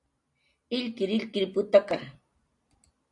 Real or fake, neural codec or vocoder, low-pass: real; none; 10.8 kHz